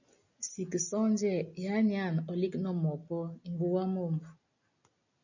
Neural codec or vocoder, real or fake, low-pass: none; real; 7.2 kHz